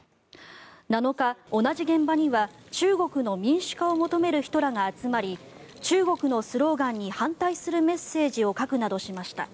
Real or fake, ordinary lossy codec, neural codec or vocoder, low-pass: real; none; none; none